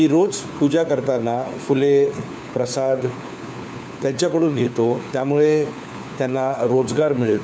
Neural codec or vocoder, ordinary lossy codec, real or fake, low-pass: codec, 16 kHz, 4 kbps, FunCodec, trained on LibriTTS, 50 frames a second; none; fake; none